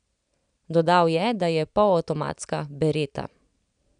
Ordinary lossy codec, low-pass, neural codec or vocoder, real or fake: none; 9.9 kHz; none; real